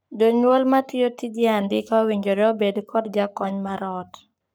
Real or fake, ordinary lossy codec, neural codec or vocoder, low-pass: fake; none; codec, 44.1 kHz, 7.8 kbps, Pupu-Codec; none